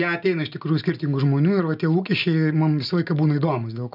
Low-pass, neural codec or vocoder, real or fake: 5.4 kHz; none; real